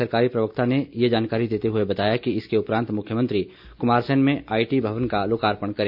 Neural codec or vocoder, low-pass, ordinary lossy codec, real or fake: none; 5.4 kHz; AAC, 48 kbps; real